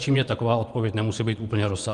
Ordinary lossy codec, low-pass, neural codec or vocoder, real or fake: Opus, 32 kbps; 10.8 kHz; vocoder, 24 kHz, 100 mel bands, Vocos; fake